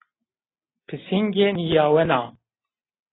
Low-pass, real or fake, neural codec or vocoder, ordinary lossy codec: 7.2 kHz; real; none; AAC, 16 kbps